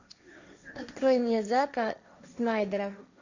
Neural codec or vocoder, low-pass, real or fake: codec, 16 kHz, 1.1 kbps, Voila-Tokenizer; 7.2 kHz; fake